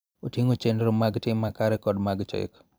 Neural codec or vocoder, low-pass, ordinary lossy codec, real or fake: vocoder, 44.1 kHz, 128 mel bands every 256 samples, BigVGAN v2; none; none; fake